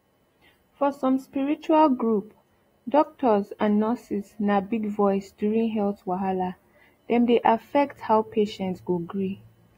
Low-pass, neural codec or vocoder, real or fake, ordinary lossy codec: 19.8 kHz; none; real; AAC, 48 kbps